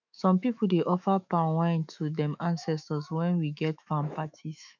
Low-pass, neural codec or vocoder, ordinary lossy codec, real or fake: 7.2 kHz; autoencoder, 48 kHz, 128 numbers a frame, DAC-VAE, trained on Japanese speech; none; fake